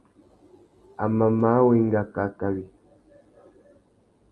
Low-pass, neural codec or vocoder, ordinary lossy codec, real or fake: 10.8 kHz; none; Opus, 32 kbps; real